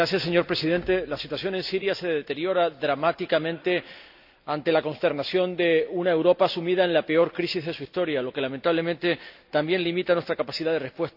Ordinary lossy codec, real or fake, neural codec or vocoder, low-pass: AAC, 48 kbps; real; none; 5.4 kHz